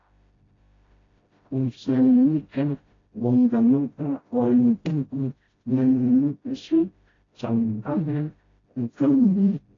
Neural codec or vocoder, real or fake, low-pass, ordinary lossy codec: codec, 16 kHz, 0.5 kbps, FreqCodec, smaller model; fake; 7.2 kHz; AAC, 32 kbps